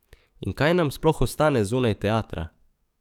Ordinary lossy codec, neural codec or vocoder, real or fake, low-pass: none; codec, 44.1 kHz, 7.8 kbps, DAC; fake; 19.8 kHz